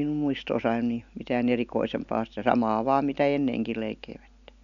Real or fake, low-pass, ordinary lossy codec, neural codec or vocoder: real; 7.2 kHz; none; none